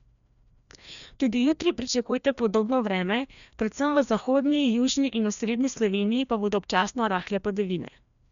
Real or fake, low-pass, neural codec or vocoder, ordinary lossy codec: fake; 7.2 kHz; codec, 16 kHz, 1 kbps, FreqCodec, larger model; none